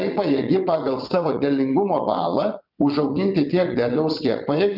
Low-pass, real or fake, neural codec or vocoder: 5.4 kHz; fake; vocoder, 22.05 kHz, 80 mel bands, WaveNeXt